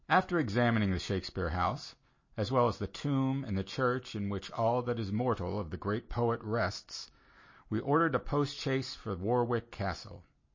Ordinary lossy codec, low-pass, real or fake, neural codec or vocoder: MP3, 32 kbps; 7.2 kHz; real; none